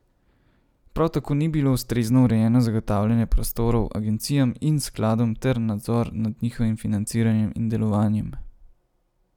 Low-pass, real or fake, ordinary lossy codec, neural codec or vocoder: 19.8 kHz; real; none; none